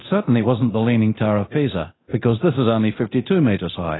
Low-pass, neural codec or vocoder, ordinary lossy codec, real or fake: 7.2 kHz; codec, 24 kHz, 0.9 kbps, DualCodec; AAC, 16 kbps; fake